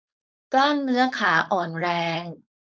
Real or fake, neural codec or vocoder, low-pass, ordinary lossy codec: fake; codec, 16 kHz, 4.8 kbps, FACodec; none; none